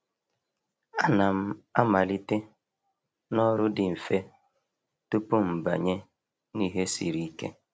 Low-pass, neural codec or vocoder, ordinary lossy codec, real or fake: none; none; none; real